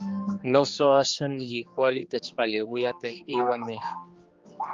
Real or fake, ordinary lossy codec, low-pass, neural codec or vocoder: fake; Opus, 32 kbps; 7.2 kHz; codec, 16 kHz, 2 kbps, X-Codec, HuBERT features, trained on general audio